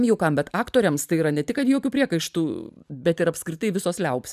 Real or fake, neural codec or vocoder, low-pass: fake; vocoder, 44.1 kHz, 128 mel bands every 512 samples, BigVGAN v2; 14.4 kHz